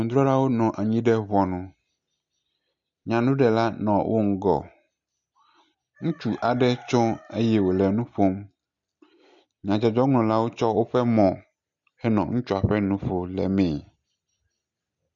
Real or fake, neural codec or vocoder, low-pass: real; none; 7.2 kHz